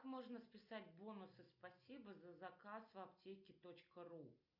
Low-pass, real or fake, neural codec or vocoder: 5.4 kHz; real; none